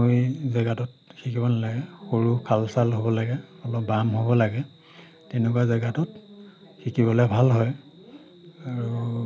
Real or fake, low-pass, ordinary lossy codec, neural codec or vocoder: real; none; none; none